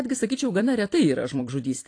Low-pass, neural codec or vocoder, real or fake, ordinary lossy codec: 9.9 kHz; vocoder, 44.1 kHz, 128 mel bands every 256 samples, BigVGAN v2; fake; AAC, 48 kbps